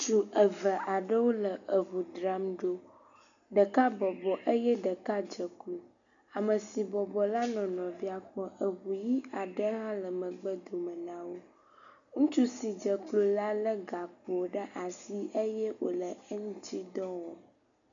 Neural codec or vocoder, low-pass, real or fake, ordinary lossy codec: none; 7.2 kHz; real; MP3, 96 kbps